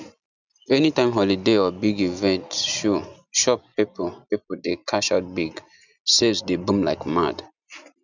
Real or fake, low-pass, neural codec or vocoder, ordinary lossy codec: real; 7.2 kHz; none; none